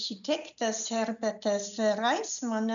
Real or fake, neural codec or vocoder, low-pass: real; none; 7.2 kHz